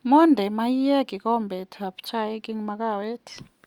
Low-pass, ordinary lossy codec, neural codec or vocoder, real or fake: 19.8 kHz; Opus, 64 kbps; none; real